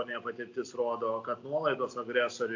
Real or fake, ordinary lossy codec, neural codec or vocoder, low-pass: real; AAC, 48 kbps; none; 7.2 kHz